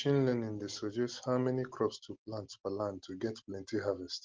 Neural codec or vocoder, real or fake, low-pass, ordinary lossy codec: none; real; 7.2 kHz; Opus, 16 kbps